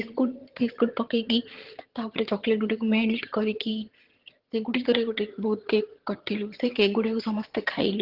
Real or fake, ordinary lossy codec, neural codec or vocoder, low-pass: fake; Opus, 16 kbps; vocoder, 22.05 kHz, 80 mel bands, HiFi-GAN; 5.4 kHz